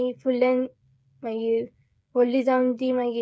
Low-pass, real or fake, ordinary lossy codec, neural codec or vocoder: none; fake; none; codec, 16 kHz, 8 kbps, FreqCodec, smaller model